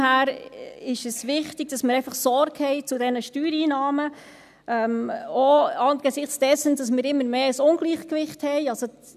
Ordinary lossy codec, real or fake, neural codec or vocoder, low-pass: none; real; none; 14.4 kHz